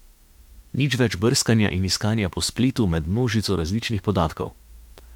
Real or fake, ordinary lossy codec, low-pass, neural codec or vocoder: fake; MP3, 96 kbps; 19.8 kHz; autoencoder, 48 kHz, 32 numbers a frame, DAC-VAE, trained on Japanese speech